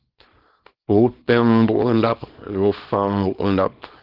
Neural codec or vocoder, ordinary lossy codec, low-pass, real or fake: codec, 24 kHz, 0.9 kbps, WavTokenizer, small release; Opus, 16 kbps; 5.4 kHz; fake